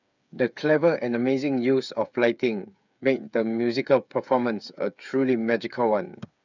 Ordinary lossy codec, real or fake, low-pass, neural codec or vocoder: none; fake; 7.2 kHz; codec, 16 kHz, 8 kbps, FreqCodec, smaller model